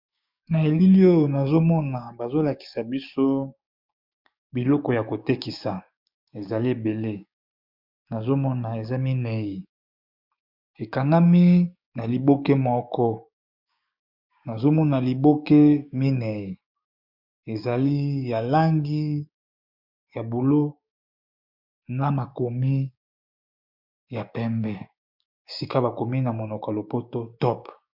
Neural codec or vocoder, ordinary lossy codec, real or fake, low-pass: codec, 16 kHz, 6 kbps, DAC; MP3, 48 kbps; fake; 5.4 kHz